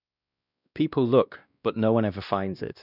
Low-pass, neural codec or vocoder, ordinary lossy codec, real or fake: 5.4 kHz; codec, 16 kHz, 1 kbps, X-Codec, WavLM features, trained on Multilingual LibriSpeech; none; fake